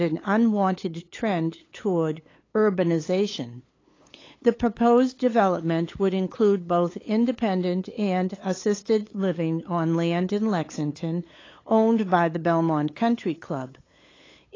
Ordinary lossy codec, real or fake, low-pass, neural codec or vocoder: AAC, 32 kbps; fake; 7.2 kHz; codec, 16 kHz, 8 kbps, FunCodec, trained on LibriTTS, 25 frames a second